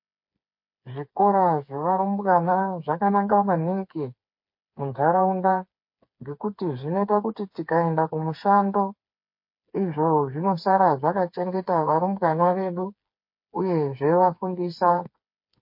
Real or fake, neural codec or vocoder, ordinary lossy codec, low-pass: fake; codec, 16 kHz, 4 kbps, FreqCodec, smaller model; MP3, 32 kbps; 5.4 kHz